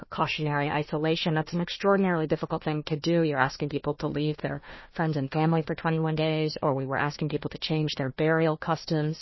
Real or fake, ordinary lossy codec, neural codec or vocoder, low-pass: fake; MP3, 24 kbps; codec, 16 kHz, 1 kbps, FunCodec, trained on Chinese and English, 50 frames a second; 7.2 kHz